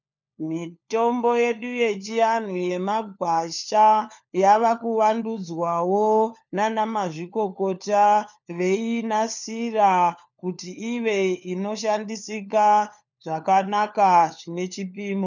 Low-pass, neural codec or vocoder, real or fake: 7.2 kHz; codec, 16 kHz, 4 kbps, FunCodec, trained on LibriTTS, 50 frames a second; fake